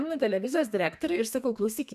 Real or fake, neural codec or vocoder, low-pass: fake; codec, 32 kHz, 1.9 kbps, SNAC; 14.4 kHz